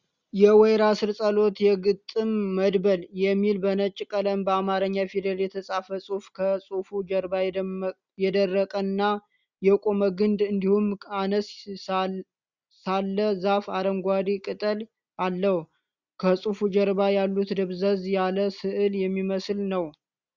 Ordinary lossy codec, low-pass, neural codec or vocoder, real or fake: Opus, 64 kbps; 7.2 kHz; none; real